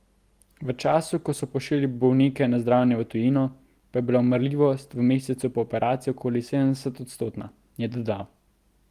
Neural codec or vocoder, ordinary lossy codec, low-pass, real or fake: none; Opus, 24 kbps; 14.4 kHz; real